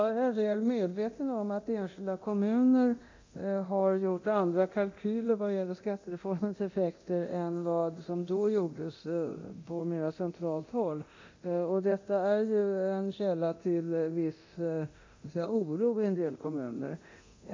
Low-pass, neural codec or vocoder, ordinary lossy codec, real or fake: 7.2 kHz; codec, 24 kHz, 0.9 kbps, DualCodec; MP3, 64 kbps; fake